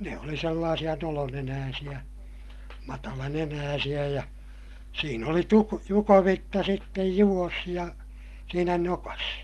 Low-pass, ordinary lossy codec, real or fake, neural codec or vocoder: 14.4 kHz; Opus, 32 kbps; real; none